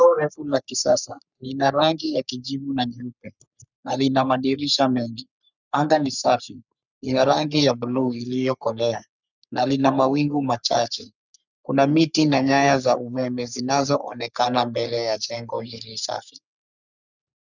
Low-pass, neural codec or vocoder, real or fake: 7.2 kHz; codec, 44.1 kHz, 3.4 kbps, Pupu-Codec; fake